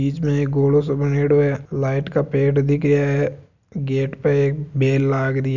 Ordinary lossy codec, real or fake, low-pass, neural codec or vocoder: none; real; 7.2 kHz; none